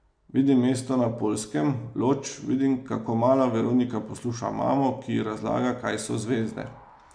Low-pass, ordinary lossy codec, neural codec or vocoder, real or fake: 9.9 kHz; MP3, 64 kbps; none; real